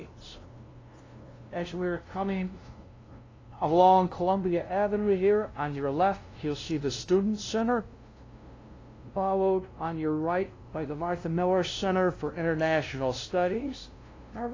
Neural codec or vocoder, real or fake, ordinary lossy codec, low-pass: codec, 16 kHz, 0.5 kbps, FunCodec, trained on LibriTTS, 25 frames a second; fake; AAC, 32 kbps; 7.2 kHz